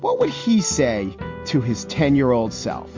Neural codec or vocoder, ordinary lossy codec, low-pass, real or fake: none; MP3, 48 kbps; 7.2 kHz; real